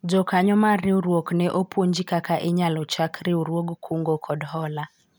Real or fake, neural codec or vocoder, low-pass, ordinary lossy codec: real; none; none; none